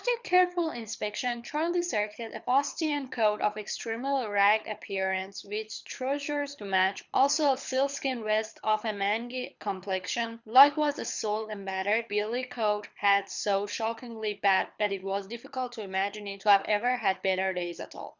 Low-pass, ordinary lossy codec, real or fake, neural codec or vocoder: 7.2 kHz; Opus, 64 kbps; fake; codec, 24 kHz, 6 kbps, HILCodec